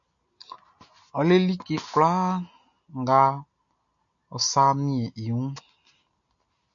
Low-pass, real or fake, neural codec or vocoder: 7.2 kHz; real; none